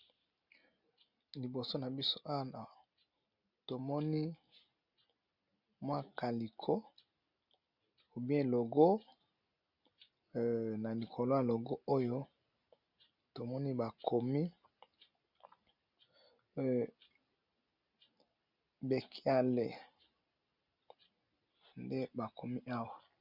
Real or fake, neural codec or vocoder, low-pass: real; none; 5.4 kHz